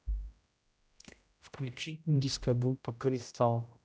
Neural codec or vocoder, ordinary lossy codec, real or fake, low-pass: codec, 16 kHz, 0.5 kbps, X-Codec, HuBERT features, trained on general audio; none; fake; none